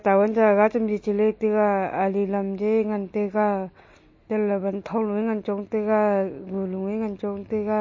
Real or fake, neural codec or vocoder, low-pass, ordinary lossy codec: real; none; 7.2 kHz; MP3, 32 kbps